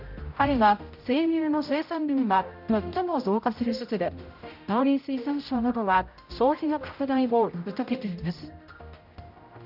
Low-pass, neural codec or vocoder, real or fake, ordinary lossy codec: 5.4 kHz; codec, 16 kHz, 0.5 kbps, X-Codec, HuBERT features, trained on general audio; fake; none